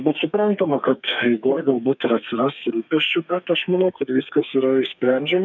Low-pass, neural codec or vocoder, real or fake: 7.2 kHz; codec, 32 kHz, 1.9 kbps, SNAC; fake